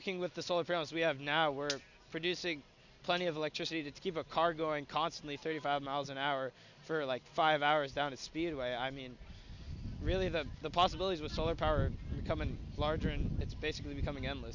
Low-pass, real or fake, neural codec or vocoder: 7.2 kHz; real; none